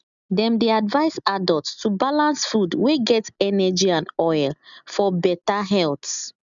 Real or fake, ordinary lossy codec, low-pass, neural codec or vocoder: real; none; 7.2 kHz; none